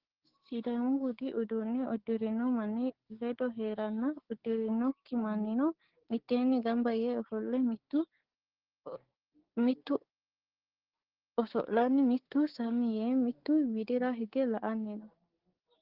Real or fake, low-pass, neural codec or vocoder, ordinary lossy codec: fake; 5.4 kHz; codec, 16 kHz, 6 kbps, DAC; Opus, 16 kbps